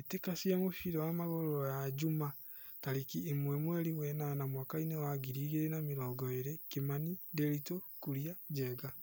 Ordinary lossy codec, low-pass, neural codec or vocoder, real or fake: none; none; none; real